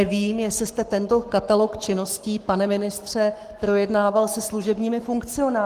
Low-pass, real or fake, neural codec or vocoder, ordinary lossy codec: 14.4 kHz; fake; codec, 44.1 kHz, 7.8 kbps, Pupu-Codec; Opus, 24 kbps